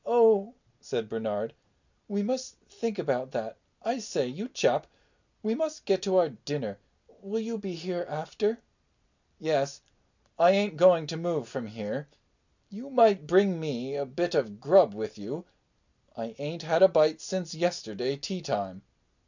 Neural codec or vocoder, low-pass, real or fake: none; 7.2 kHz; real